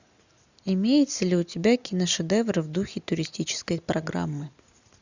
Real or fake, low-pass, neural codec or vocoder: real; 7.2 kHz; none